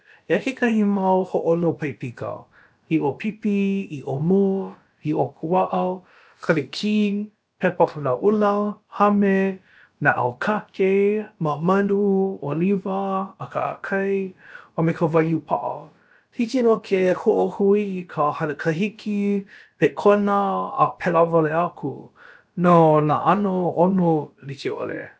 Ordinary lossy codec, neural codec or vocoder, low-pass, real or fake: none; codec, 16 kHz, about 1 kbps, DyCAST, with the encoder's durations; none; fake